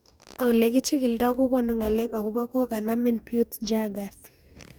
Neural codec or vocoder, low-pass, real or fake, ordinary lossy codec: codec, 44.1 kHz, 2.6 kbps, DAC; none; fake; none